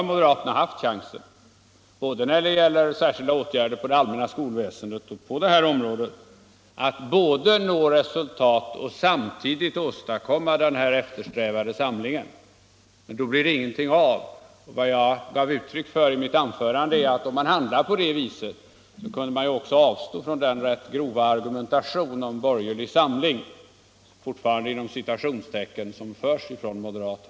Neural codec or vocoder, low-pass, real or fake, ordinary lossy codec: none; none; real; none